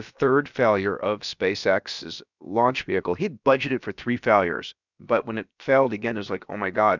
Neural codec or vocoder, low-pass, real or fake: codec, 16 kHz, about 1 kbps, DyCAST, with the encoder's durations; 7.2 kHz; fake